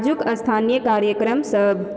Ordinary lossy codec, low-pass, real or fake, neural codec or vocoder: none; none; real; none